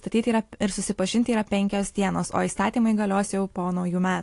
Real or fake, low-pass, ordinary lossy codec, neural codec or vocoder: real; 10.8 kHz; AAC, 48 kbps; none